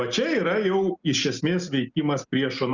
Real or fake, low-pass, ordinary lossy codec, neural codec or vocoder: real; 7.2 kHz; Opus, 64 kbps; none